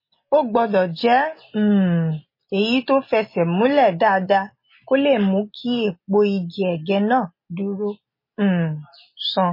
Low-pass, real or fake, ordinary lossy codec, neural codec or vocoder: 5.4 kHz; real; MP3, 24 kbps; none